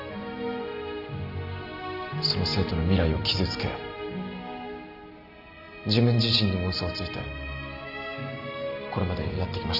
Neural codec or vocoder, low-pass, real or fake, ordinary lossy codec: none; 5.4 kHz; real; none